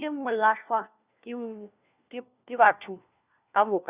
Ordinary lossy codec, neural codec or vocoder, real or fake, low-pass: Opus, 64 kbps; codec, 16 kHz, 1 kbps, FunCodec, trained on LibriTTS, 50 frames a second; fake; 3.6 kHz